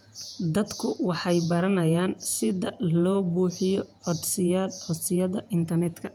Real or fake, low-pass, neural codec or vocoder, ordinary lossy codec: fake; 19.8 kHz; vocoder, 48 kHz, 128 mel bands, Vocos; none